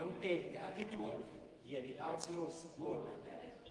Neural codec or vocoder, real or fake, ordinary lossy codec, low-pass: codec, 24 kHz, 0.9 kbps, WavTokenizer, medium music audio release; fake; Opus, 24 kbps; 10.8 kHz